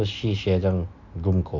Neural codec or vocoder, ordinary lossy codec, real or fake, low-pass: none; MP3, 48 kbps; real; 7.2 kHz